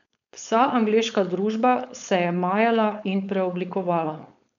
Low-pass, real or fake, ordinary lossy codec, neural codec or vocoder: 7.2 kHz; fake; none; codec, 16 kHz, 4.8 kbps, FACodec